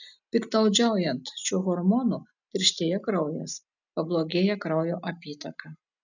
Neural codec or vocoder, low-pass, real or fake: none; 7.2 kHz; real